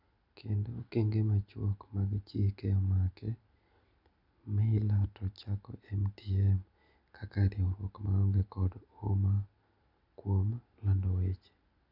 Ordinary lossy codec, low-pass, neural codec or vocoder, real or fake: none; 5.4 kHz; none; real